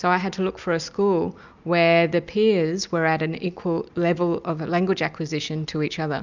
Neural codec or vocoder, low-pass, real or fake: none; 7.2 kHz; real